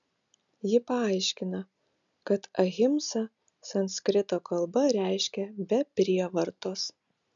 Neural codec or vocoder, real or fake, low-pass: none; real; 7.2 kHz